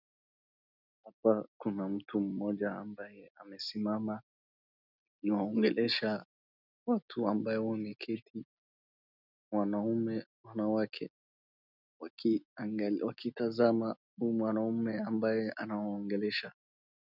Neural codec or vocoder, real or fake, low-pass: none; real; 5.4 kHz